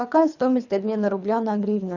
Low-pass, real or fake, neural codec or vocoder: 7.2 kHz; fake; codec, 24 kHz, 3 kbps, HILCodec